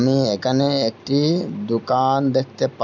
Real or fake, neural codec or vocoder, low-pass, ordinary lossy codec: real; none; 7.2 kHz; none